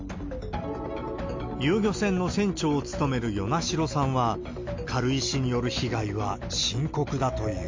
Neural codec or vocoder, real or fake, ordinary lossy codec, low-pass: none; real; MP3, 48 kbps; 7.2 kHz